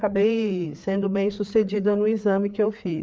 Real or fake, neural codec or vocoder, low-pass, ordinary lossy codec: fake; codec, 16 kHz, 4 kbps, FreqCodec, larger model; none; none